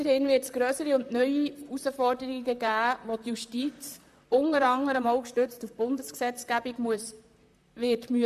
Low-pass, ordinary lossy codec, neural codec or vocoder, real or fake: 14.4 kHz; AAC, 96 kbps; vocoder, 44.1 kHz, 128 mel bands, Pupu-Vocoder; fake